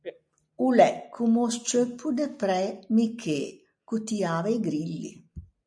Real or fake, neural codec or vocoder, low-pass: real; none; 9.9 kHz